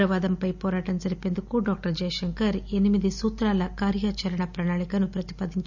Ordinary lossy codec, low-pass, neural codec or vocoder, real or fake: none; 7.2 kHz; none; real